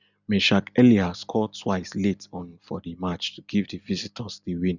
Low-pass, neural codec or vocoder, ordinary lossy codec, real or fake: 7.2 kHz; none; none; real